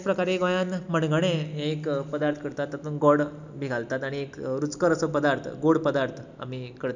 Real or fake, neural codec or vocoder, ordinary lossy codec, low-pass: real; none; none; 7.2 kHz